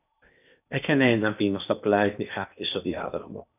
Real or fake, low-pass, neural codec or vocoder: fake; 3.6 kHz; codec, 16 kHz in and 24 kHz out, 0.8 kbps, FocalCodec, streaming, 65536 codes